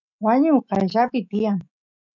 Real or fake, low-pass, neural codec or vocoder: fake; 7.2 kHz; autoencoder, 48 kHz, 128 numbers a frame, DAC-VAE, trained on Japanese speech